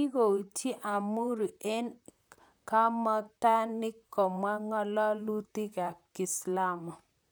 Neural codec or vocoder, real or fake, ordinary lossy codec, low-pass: vocoder, 44.1 kHz, 128 mel bands, Pupu-Vocoder; fake; none; none